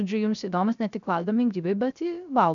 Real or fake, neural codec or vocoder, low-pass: fake; codec, 16 kHz, 0.3 kbps, FocalCodec; 7.2 kHz